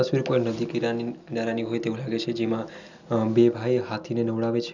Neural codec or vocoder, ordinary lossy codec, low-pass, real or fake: none; none; 7.2 kHz; real